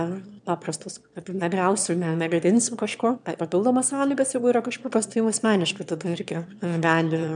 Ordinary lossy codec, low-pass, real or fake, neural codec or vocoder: MP3, 96 kbps; 9.9 kHz; fake; autoencoder, 22.05 kHz, a latent of 192 numbers a frame, VITS, trained on one speaker